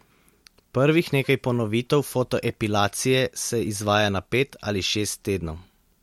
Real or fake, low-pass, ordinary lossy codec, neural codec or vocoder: real; 19.8 kHz; MP3, 64 kbps; none